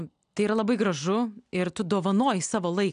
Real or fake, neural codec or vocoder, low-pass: real; none; 9.9 kHz